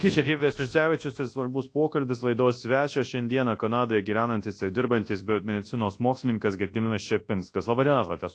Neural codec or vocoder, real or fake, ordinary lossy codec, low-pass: codec, 24 kHz, 0.9 kbps, WavTokenizer, large speech release; fake; AAC, 48 kbps; 9.9 kHz